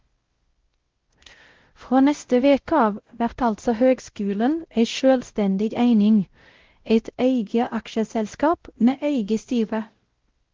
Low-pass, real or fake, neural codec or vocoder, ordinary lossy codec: 7.2 kHz; fake; codec, 16 kHz, 0.5 kbps, X-Codec, WavLM features, trained on Multilingual LibriSpeech; Opus, 16 kbps